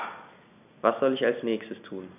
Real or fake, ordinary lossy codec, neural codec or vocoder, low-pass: real; none; none; 3.6 kHz